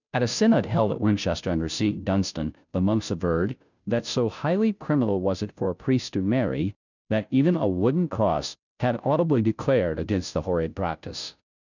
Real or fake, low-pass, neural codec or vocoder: fake; 7.2 kHz; codec, 16 kHz, 0.5 kbps, FunCodec, trained on Chinese and English, 25 frames a second